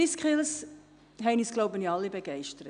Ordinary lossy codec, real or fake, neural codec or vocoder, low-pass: none; real; none; 9.9 kHz